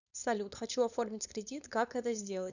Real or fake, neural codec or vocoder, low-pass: fake; codec, 16 kHz, 4.8 kbps, FACodec; 7.2 kHz